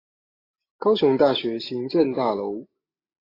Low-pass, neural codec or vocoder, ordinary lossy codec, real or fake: 5.4 kHz; none; AAC, 24 kbps; real